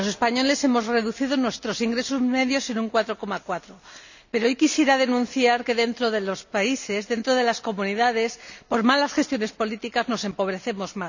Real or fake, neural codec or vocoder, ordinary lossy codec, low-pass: real; none; none; 7.2 kHz